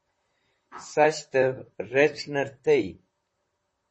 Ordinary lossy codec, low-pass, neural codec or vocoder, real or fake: MP3, 32 kbps; 10.8 kHz; vocoder, 44.1 kHz, 128 mel bands, Pupu-Vocoder; fake